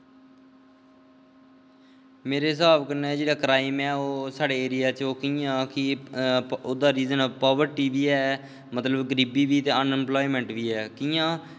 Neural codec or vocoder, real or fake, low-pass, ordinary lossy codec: none; real; none; none